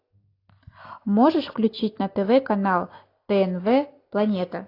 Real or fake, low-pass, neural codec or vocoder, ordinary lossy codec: real; 5.4 kHz; none; AAC, 32 kbps